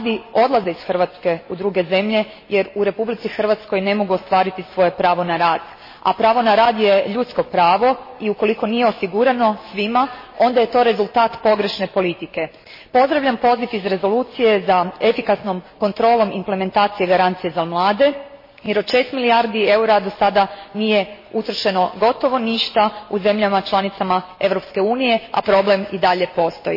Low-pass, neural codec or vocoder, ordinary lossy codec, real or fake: 5.4 kHz; none; MP3, 24 kbps; real